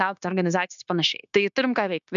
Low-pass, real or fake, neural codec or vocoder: 7.2 kHz; fake; codec, 16 kHz, 0.9 kbps, LongCat-Audio-Codec